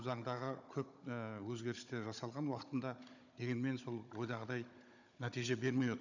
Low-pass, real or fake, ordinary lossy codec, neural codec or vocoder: 7.2 kHz; fake; none; codec, 16 kHz, 16 kbps, FunCodec, trained on Chinese and English, 50 frames a second